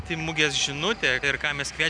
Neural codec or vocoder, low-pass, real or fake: none; 9.9 kHz; real